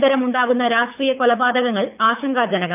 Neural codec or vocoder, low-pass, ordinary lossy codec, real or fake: codec, 24 kHz, 6 kbps, HILCodec; 3.6 kHz; none; fake